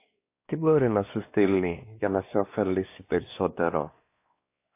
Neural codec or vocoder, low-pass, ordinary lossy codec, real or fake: codec, 16 kHz, 2 kbps, X-Codec, WavLM features, trained on Multilingual LibriSpeech; 3.6 kHz; AAC, 24 kbps; fake